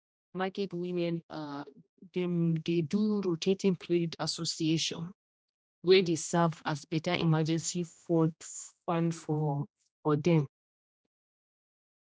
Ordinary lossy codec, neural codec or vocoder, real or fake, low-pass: none; codec, 16 kHz, 1 kbps, X-Codec, HuBERT features, trained on general audio; fake; none